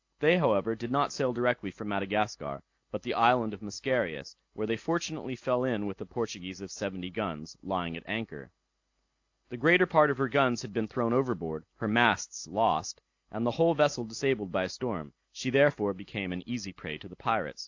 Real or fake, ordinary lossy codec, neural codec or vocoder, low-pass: real; AAC, 48 kbps; none; 7.2 kHz